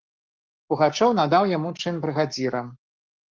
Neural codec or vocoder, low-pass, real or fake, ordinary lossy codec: codec, 44.1 kHz, 7.8 kbps, Pupu-Codec; 7.2 kHz; fake; Opus, 24 kbps